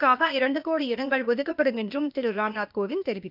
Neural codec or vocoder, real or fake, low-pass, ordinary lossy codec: codec, 16 kHz, 0.8 kbps, ZipCodec; fake; 5.4 kHz; none